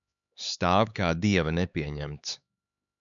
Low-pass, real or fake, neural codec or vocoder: 7.2 kHz; fake; codec, 16 kHz, 4 kbps, X-Codec, HuBERT features, trained on LibriSpeech